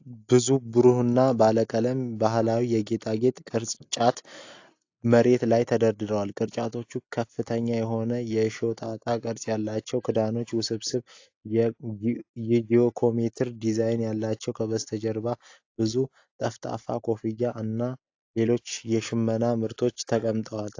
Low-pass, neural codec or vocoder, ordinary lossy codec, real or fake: 7.2 kHz; none; AAC, 48 kbps; real